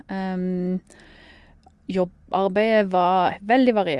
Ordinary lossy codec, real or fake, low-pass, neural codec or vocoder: Opus, 32 kbps; real; 10.8 kHz; none